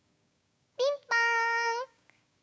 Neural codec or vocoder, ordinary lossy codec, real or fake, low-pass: codec, 16 kHz, 6 kbps, DAC; none; fake; none